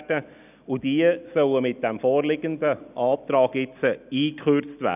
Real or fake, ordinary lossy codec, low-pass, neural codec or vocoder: real; none; 3.6 kHz; none